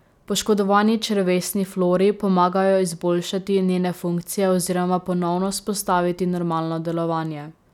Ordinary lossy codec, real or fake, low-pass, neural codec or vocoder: none; real; 19.8 kHz; none